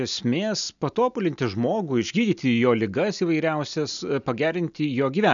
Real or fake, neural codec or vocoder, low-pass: real; none; 7.2 kHz